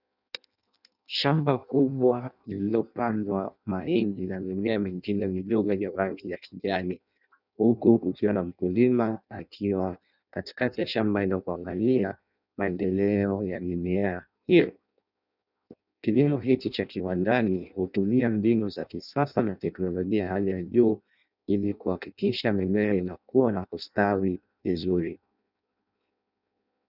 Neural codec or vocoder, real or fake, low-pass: codec, 16 kHz in and 24 kHz out, 0.6 kbps, FireRedTTS-2 codec; fake; 5.4 kHz